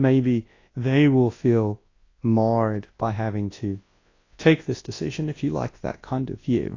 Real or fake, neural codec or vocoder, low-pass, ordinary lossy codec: fake; codec, 24 kHz, 0.9 kbps, WavTokenizer, large speech release; 7.2 kHz; AAC, 32 kbps